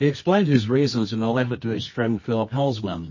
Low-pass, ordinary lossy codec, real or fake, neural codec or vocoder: 7.2 kHz; MP3, 32 kbps; fake; codec, 24 kHz, 0.9 kbps, WavTokenizer, medium music audio release